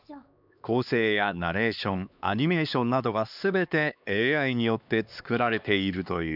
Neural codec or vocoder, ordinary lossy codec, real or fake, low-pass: codec, 16 kHz, 2 kbps, X-Codec, HuBERT features, trained on LibriSpeech; none; fake; 5.4 kHz